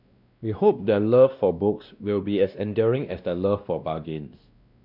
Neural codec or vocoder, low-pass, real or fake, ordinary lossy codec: codec, 16 kHz, 1 kbps, X-Codec, WavLM features, trained on Multilingual LibriSpeech; 5.4 kHz; fake; none